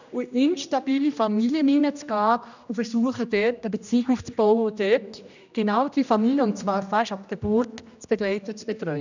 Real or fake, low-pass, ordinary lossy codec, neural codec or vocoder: fake; 7.2 kHz; none; codec, 16 kHz, 1 kbps, X-Codec, HuBERT features, trained on general audio